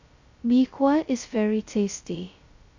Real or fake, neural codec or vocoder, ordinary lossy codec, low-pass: fake; codec, 16 kHz, 0.2 kbps, FocalCodec; Opus, 64 kbps; 7.2 kHz